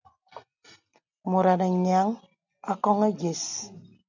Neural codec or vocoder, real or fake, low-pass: none; real; 7.2 kHz